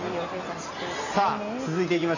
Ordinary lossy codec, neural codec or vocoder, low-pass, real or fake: none; none; 7.2 kHz; real